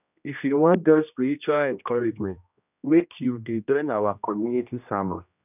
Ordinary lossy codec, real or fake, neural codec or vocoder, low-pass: none; fake; codec, 16 kHz, 1 kbps, X-Codec, HuBERT features, trained on general audio; 3.6 kHz